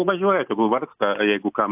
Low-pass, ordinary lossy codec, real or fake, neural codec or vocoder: 3.6 kHz; AAC, 32 kbps; real; none